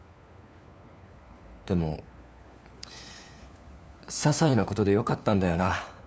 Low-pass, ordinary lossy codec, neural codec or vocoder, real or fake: none; none; codec, 16 kHz, 4 kbps, FunCodec, trained on LibriTTS, 50 frames a second; fake